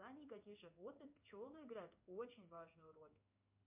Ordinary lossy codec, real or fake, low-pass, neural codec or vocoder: MP3, 32 kbps; fake; 3.6 kHz; codec, 16 kHz in and 24 kHz out, 1 kbps, XY-Tokenizer